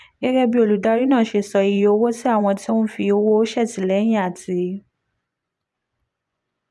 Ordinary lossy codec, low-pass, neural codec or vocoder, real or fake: none; none; vocoder, 24 kHz, 100 mel bands, Vocos; fake